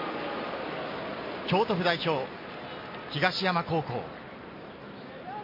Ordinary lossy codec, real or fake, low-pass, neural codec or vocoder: MP3, 24 kbps; real; 5.4 kHz; none